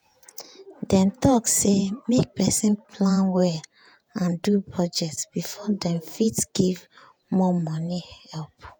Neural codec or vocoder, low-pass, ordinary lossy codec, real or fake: vocoder, 48 kHz, 128 mel bands, Vocos; none; none; fake